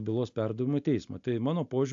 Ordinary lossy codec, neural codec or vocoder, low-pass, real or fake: AAC, 64 kbps; none; 7.2 kHz; real